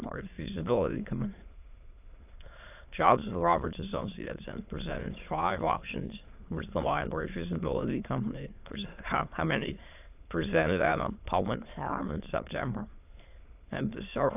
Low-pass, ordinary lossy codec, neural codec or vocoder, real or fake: 3.6 kHz; AAC, 32 kbps; autoencoder, 22.05 kHz, a latent of 192 numbers a frame, VITS, trained on many speakers; fake